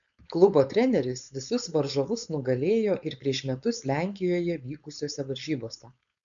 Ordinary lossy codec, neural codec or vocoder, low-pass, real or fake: MP3, 96 kbps; codec, 16 kHz, 4.8 kbps, FACodec; 7.2 kHz; fake